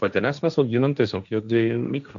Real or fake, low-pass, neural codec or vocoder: fake; 7.2 kHz; codec, 16 kHz, 1.1 kbps, Voila-Tokenizer